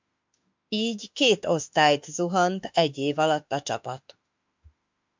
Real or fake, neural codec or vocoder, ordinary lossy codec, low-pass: fake; autoencoder, 48 kHz, 32 numbers a frame, DAC-VAE, trained on Japanese speech; MP3, 64 kbps; 7.2 kHz